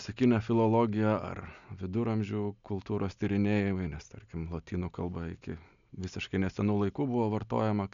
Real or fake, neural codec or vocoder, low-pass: real; none; 7.2 kHz